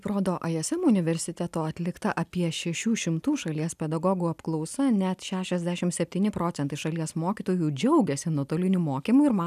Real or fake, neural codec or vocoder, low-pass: real; none; 14.4 kHz